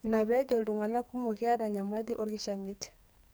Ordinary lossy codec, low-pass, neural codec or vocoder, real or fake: none; none; codec, 44.1 kHz, 2.6 kbps, SNAC; fake